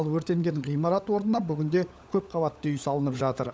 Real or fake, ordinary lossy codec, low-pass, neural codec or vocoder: fake; none; none; codec, 16 kHz, 16 kbps, FunCodec, trained on LibriTTS, 50 frames a second